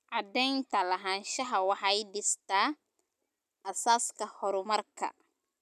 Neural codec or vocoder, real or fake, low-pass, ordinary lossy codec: none; real; 14.4 kHz; none